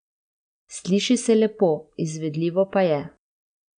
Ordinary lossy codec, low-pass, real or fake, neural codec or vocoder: none; 9.9 kHz; real; none